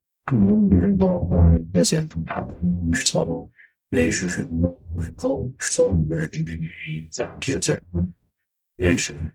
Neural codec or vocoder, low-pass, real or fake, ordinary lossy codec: codec, 44.1 kHz, 0.9 kbps, DAC; 19.8 kHz; fake; none